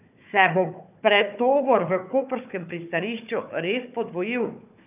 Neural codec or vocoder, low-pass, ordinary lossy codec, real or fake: codec, 16 kHz, 4 kbps, FunCodec, trained on Chinese and English, 50 frames a second; 3.6 kHz; none; fake